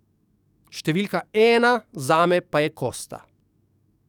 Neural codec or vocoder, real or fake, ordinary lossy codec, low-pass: codec, 44.1 kHz, 7.8 kbps, DAC; fake; none; 19.8 kHz